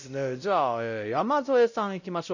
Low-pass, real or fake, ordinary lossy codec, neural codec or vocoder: 7.2 kHz; fake; none; codec, 16 kHz, 0.5 kbps, X-Codec, WavLM features, trained on Multilingual LibriSpeech